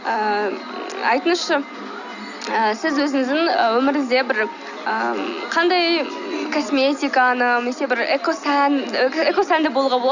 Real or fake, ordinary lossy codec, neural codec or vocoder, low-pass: real; none; none; 7.2 kHz